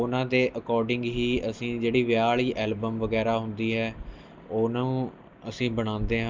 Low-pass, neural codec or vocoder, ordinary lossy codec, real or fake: 7.2 kHz; none; Opus, 32 kbps; real